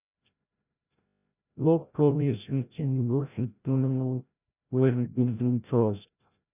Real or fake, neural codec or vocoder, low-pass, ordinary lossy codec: fake; codec, 16 kHz, 0.5 kbps, FreqCodec, larger model; 3.6 kHz; none